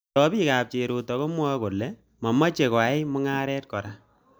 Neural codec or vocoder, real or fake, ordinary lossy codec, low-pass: none; real; none; none